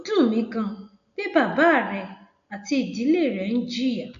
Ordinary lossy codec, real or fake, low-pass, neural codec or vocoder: none; real; 7.2 kHz; none